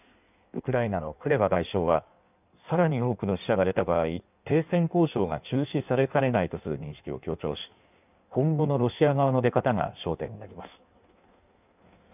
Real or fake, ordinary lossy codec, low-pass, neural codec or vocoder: fake; none; 3.6 kHz; codec, 16 kHz in and 24 kHz out, 1.1 kbps, FireRedTTS-2 codec